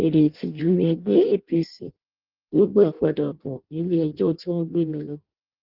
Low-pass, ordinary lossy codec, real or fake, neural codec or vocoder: 5.4 kHz; Opus, 32 kbps; fake; codec, 16 kHz in and 24 kHz out, 0.6 kbps, FireRedTTS-2 codec